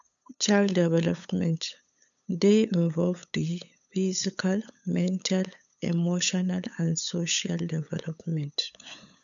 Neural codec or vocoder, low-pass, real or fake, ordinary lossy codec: codec, 16 kHz, 8 kbps, FunCodec, trained on LibriTTS, 25 frames a second; 7.2 kHz; fake; none